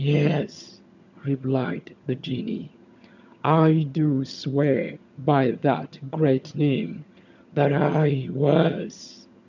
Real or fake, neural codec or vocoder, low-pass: fake; vocoder, 22.05 kHz, 80 mel bands, HiFi-GAN; 7.2 kHz